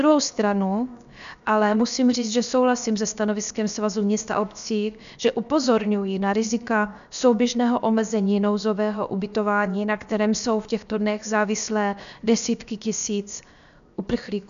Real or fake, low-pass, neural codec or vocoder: fake; 7.2 kHz; codec, 16 kHz, 0.7 kbps, FocalCodec